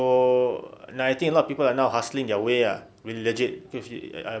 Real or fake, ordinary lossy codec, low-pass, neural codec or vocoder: real; none; none; none